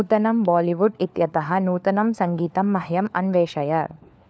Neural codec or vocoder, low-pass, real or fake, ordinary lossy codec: codec, 16 kHz, 4 kbps, FunCodec, trained on LibriTTS, 50 frames a second; none; fake; none